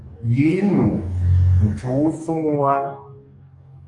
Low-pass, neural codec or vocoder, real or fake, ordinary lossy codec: 10.8 kHz; codec, 44.1 kHz, 2.6 kbps, DAC; fake; AAC, 64 kbps